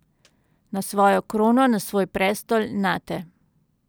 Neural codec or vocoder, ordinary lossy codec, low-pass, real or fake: none; none; none; real